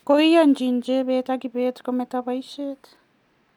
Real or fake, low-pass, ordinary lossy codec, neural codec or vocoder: real; 19.8 kHz; none; none